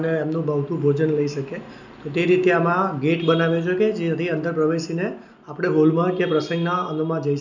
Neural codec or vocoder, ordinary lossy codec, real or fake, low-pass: none; none; real; 7.2 kHz